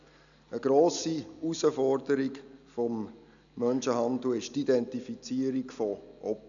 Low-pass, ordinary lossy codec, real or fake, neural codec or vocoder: 7.2 kHz; none; real; none